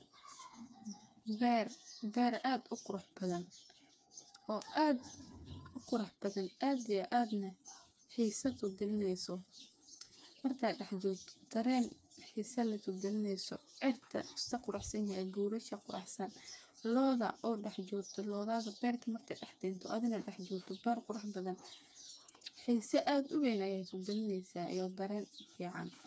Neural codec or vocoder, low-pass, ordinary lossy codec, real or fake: codec, 16 kHz, 4 kbps, FreqCodec, smaller model; none; none; fake